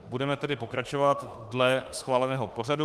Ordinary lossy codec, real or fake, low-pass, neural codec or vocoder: Opus, 32 kbps; fake; 14.4 kHz; autoencoder, 48 kHz, 32 numbers a frame, DAC-VAE, trained on Japanese speech